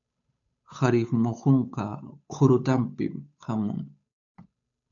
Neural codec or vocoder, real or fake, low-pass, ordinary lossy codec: codec, 16 kHz, 8 kbps, FunCodec, trained on Chinese and English, 25 frames a second; fake; 7.2 kHz; AAC, 64 kbps